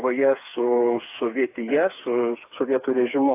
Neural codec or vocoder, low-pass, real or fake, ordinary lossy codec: codec, 16 kHz, 4 kbps, FreqCodec, smaller model; 3.6 kHz; fake; AAC, 24 kbps